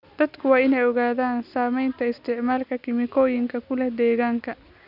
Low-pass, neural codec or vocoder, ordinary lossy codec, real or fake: 5.4 kHz; none; none; real